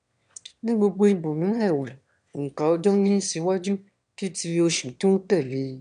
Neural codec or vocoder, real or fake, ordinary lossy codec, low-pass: autoencoder, 22.05 kHz, a latent of 192 numbers a frame, VITS, trained on one speaker; fake; none; 9.9 kHz